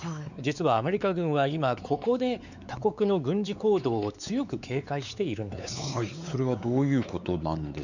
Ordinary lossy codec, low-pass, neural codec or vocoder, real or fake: none; 7.2 kHz; codec, 16 kHz, 4 kbps, X-Codec, WavLM features, trained on Multilingual LibriSpeech; fake